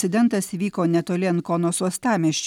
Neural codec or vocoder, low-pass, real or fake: none; 19.8 kHz; real